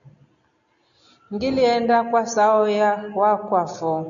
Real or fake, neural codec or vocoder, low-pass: real; none; 7.2 kHz